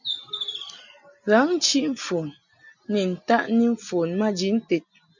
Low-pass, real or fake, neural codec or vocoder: 7.2 kHz; real; none